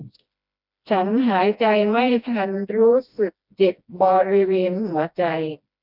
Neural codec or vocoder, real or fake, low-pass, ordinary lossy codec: codec, 16 kHz, 1 kbps, FreqCodec, smaller model; fake; 5.4 kHz; none